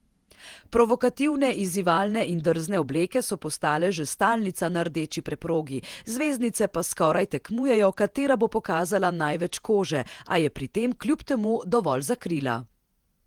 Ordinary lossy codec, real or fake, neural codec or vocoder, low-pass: Opus, 24 kbps; fake; vocoder, 48 kHz, 128 mel bands, Vocos; 19.8 kHz